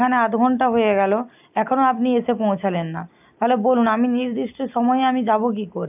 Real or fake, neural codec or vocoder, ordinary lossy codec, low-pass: real; none; AAC, 32 kbps; 3.6 kHz